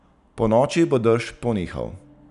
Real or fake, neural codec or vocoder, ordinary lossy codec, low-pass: real; none; none; 10.8 kHz